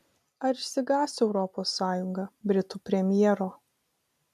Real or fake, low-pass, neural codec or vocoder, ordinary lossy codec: real; 14.4 kHz; none; AAC, 96 kbps